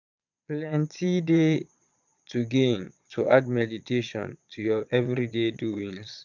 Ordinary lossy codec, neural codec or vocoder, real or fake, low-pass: none; vocoder, 22.05 kHz, 80 mel bands, Vocos; fake; 7.2 kHz